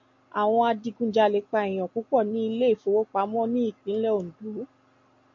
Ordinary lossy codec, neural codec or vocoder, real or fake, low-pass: AAC, 48 kbps; none; real; 7.2 kHz